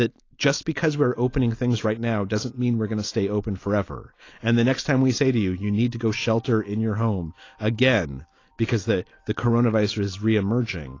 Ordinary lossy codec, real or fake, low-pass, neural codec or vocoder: AAC, 32 kbps; real; 7.2 kHz; none